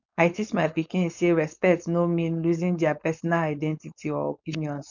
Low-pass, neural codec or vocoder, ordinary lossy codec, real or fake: 7.2 kHz; codec, 16 kHz, 4.8 kbps, FACodec; none; fake